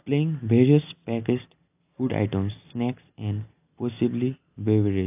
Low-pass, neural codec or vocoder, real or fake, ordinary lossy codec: 3.6 kHz; none; real; AAC, 32 kbps